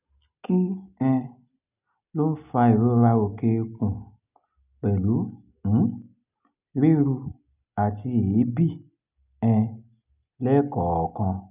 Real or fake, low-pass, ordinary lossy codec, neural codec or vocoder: real; 3.6 kHz; none; none